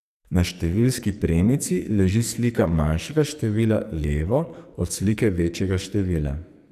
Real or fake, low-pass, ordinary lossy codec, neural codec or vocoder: fake; 14.4 kHz; none; codec, 44.1 kHz, 2.6 kbps, SNAC